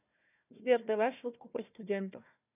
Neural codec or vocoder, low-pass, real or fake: codec, 16 kHz, 1 kbps, FunCodec, trained on Chinese and English, 50 frames a second; 3.6 kHz; fake